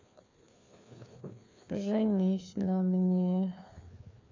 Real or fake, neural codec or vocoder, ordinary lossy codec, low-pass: fake; codec, 16 kHz, 2 kbps, FreqCodec, larger model; MP3, 64 kbps; 7.2 kHz